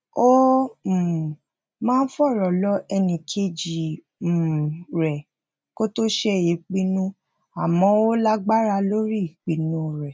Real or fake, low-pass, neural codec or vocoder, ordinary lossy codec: real; none; none; none